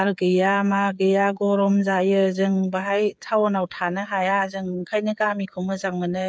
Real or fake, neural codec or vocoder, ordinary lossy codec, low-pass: fake; codec, 16 kHz, 8 kbps, FreqCodec, smaller model; none; none